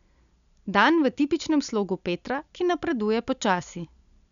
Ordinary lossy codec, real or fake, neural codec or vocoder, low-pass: none; real; none; 7.2 kHz